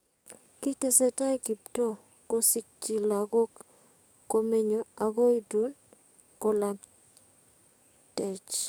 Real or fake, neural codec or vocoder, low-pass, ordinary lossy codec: fake; codec, 44.1 kHz, 7.8 kbps, DAC; none; none